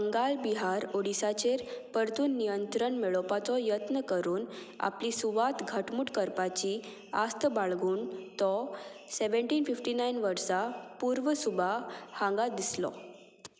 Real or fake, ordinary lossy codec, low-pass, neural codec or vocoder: real; none; none; none